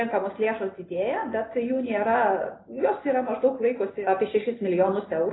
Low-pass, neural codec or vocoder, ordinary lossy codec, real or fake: 7.2 kHz; none; AAC, 16 kbps; real